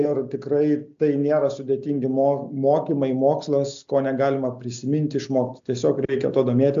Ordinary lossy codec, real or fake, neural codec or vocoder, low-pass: AAC, 64 kbps; real; none; 7.2 kHz